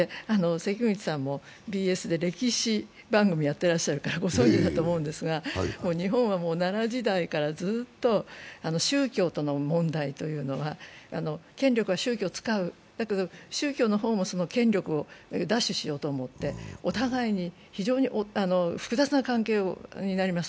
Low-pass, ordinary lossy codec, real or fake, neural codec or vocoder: none; none; real; none